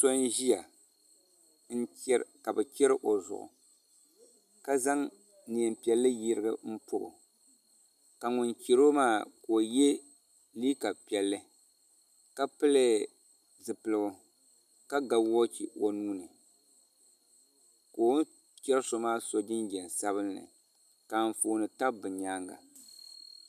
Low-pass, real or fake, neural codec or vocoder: 14.4 kHz; real; none